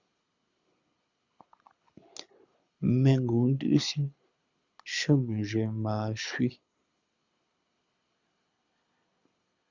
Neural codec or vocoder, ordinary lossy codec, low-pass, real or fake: codec, 24 kHz, 6 kbps, HILCodec; Opus, 64 kbps; 7.2 kHz; fake